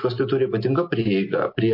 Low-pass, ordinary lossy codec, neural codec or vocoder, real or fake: 5.4 kHz; MP3, 32 kbps; none; real